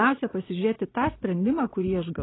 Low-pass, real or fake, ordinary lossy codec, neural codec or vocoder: 7.2 kHz; real; AAC, 16 kbps; none